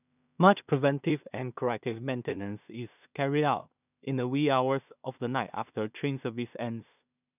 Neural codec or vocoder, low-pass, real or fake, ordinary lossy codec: codec, 16 kHz in and 24 kHz out, 0.4 kbps, LongCat-Audio-Codec, two codebook decoder; 3.6 kHz; fake; none